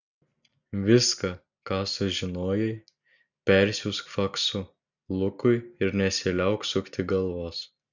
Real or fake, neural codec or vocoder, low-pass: real; none; 7.2 kHz